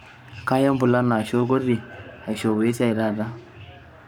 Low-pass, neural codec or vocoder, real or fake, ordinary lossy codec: none; codec, 44.1 kHz, 7.8 kbps, Pupu-Codec; fake; none